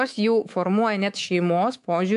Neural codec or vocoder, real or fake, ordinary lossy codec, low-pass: codec, 24 kHz, 3.1 kbps, DualCodec; fake; AAC, 64 kbps; 10.8 kHz